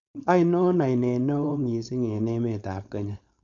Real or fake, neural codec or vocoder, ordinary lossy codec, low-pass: fake; codec, 16 kHz, 4.8 kbps, FACodec; MP3, 96 kbps; 7.2 kHz